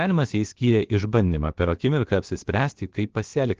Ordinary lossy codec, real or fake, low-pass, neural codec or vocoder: Opus, 16 kbps; fake; 7.2 kHz; codec, 16 kHz, about 1 kbps, DyCAST, with the encoder's durations